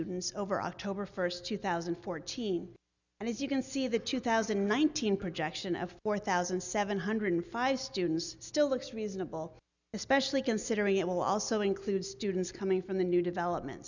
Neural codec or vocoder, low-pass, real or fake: none; 7.2 kHz; real